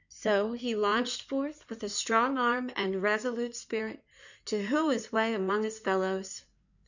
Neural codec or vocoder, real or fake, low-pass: codec, 16 kHz in and 24 kHz out, 2.2 kbps, FireRedTTS-2 codec; fake; 7.2 kHz